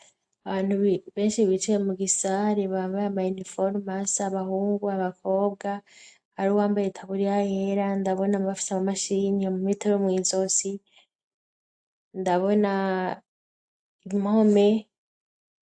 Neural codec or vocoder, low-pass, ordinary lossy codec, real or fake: none; 9.9 kHz; AAC, 64 kbps; real